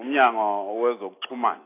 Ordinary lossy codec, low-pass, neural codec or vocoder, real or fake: AAC, 24 kbps; 3.6 kHz; none; real